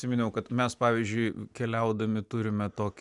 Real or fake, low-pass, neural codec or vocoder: real; 10.8 kHz; none